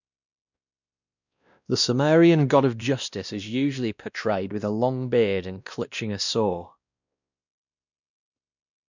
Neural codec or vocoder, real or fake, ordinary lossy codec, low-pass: codec, 16 kHz, 1 kbps, X-Codec, WavLM features, trained on Multilingual LibriSpeech; fake; none; 7.2 kHz